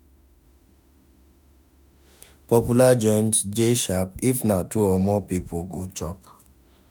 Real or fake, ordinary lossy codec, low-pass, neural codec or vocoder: fake; none; none; autoencoder, 48 kHz, 32 numbers a frame, DAC-VAE, trained on Japanese speech